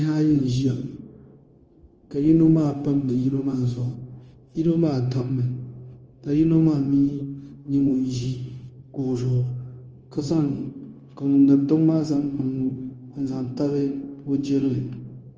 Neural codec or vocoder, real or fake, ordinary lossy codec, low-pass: codec, 16 kHz, 0.9 kbps, LongCat-Audio-Codec; fake; Opus, 24 kbps; 7.2 kHz